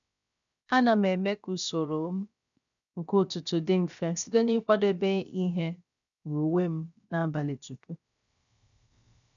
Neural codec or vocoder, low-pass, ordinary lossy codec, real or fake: codec, 16 kHz, 0.7 kbps, FocalCodec; 7.2 kHz; none; fake